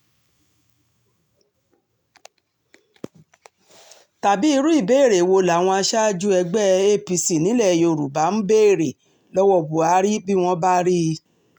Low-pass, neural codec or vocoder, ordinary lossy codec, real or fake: 19.8 kHz; none; none; real